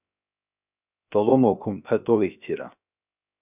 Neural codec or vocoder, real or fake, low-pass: codec, 16 kHz, 0.7 kbps, FocalCodec; fake; 3.6 kHz